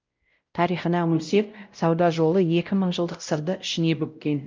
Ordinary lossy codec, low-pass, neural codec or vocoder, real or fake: Opus, 24 kbps; 7.2 kHz; codec, 16 kHz, 0.5 kbps, X-Codec, WavLM features, trained on Multilingual LibriSpeech; fake